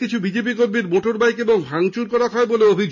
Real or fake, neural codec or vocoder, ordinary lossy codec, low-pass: real; none; none; 7.2 kHz